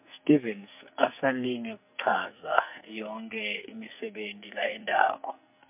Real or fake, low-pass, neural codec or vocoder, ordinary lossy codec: fake; 3.6 kHz; codec, 44.1 kHz, 2.6 kbps, SNAC; MP3, 32 kbps